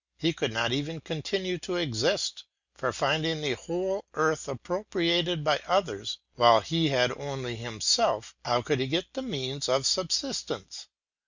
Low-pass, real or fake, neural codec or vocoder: 7.2 kHz; real; none